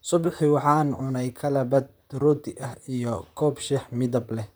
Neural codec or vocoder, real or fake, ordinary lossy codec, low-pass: vocoder, 44.1 kHz, 128 mel bands, Pupu-Vocoder; fake; none; none